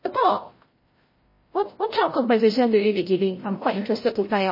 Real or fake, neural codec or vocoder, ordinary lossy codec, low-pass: fake; codec, 16 kHz, 0.5 kbps, FreqCodec, larger model; MP3, 24 kbps; 5.4 kHz